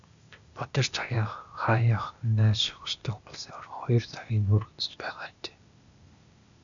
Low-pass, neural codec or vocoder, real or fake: 7.2 kHz; codec, 16 kHz, 0.8 kbps, ZipCodec; fake